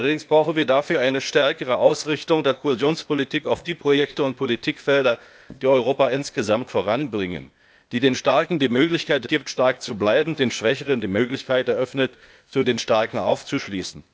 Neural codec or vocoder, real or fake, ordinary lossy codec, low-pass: codec, 16 kHz, 0.8 kbps, ZipCodec; fake; none; none